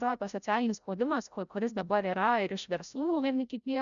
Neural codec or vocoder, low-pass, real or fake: codec, 16 kHz, 0.5 kbps, FreqCodec, larger model; 7.2 kHz; fake